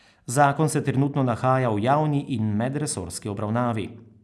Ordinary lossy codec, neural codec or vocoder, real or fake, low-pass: none; none; real; none